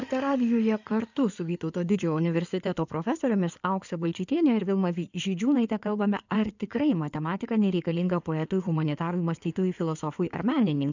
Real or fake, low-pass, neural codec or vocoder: fake; 7.2 kHz; codec, 16 kHz in and 24 kHz out, 2.2 kbps, FireRedTTS-2 codec